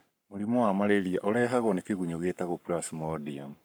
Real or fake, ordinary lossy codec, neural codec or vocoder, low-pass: fake; none; codec, 44.1 kHz, 7.8 kbps, Pupu-Codec; none